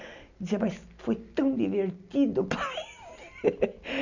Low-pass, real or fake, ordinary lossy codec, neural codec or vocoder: 7.2 kHz; real; none; none